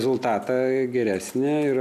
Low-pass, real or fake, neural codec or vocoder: 14.4 kHz; real; none